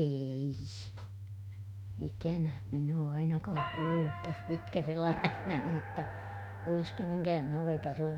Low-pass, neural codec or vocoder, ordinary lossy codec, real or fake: 19.8 kHz; autoencoder, 48 kHz, 32 numbers a frame, DAC-VAE, trained on Japanese speech; none; fake